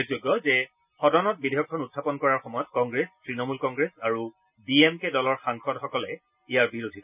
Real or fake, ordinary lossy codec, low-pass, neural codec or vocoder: real; none; 3.6 kHz; none